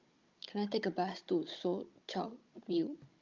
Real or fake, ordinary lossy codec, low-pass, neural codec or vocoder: fake; Opus, 24 kbps; 7.2 kHz; codec, 16 kHz, 16 kbps, FunCodec, trained on Chinese and English, 50 frames a second